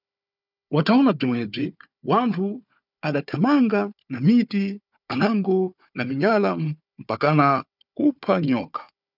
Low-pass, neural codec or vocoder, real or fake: 5.4 kHz; codec, 16 kHz, 4 kbps, FunCodec, trained on Chinese and English, 50 frames a second; fake